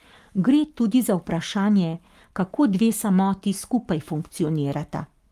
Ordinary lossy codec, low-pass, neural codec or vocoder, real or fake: Opus, 32 kbps; 14.4 kHz; codec, 44.1 kHz, 7.8 kbps, Pupu-Codec; fake